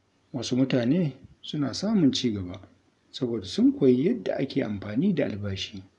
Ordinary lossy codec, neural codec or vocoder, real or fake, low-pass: none; vocoder, 24 kHz, 100 mel bands, Vocos; fake; 10.8 kHz